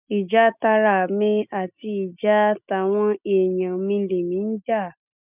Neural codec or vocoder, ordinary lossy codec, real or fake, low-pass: none; none; real; 3.6 kHz